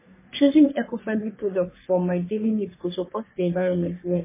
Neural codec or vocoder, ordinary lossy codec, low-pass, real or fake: codec, 44.1 kHz, 3.4 kbps, Pupu-Codec; MP3, 16 kbps; 3.6 kHz; fake